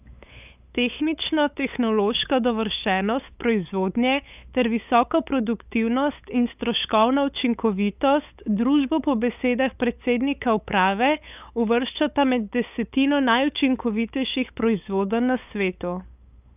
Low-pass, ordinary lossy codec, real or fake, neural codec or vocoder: 3.6 kHz; none; fake; codec, 16 kHz, 8 kbps, FunCodec, trained on LibriTTS, 25 frames a second